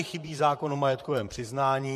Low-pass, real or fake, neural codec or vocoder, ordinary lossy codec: 14.4 kHz; fake; vocoder, 44.1 kHz, 128 mel bands, Pupu-Vocoder; MP3, 64 kbps